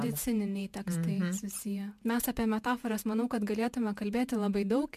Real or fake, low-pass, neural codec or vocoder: fake; 14.4 kHz; vocoder, 48 kHz, 128 mel bands, Vocos